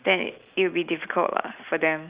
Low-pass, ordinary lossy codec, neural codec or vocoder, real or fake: 3.6 kHz; Opus, 64 kbps; none; real